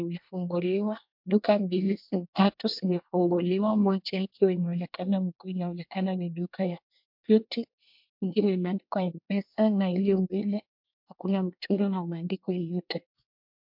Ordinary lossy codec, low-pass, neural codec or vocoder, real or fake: MP3, 48 kbps; 5.4 kHz; codec, 24 kHz, 1 kbps, SNAC; fake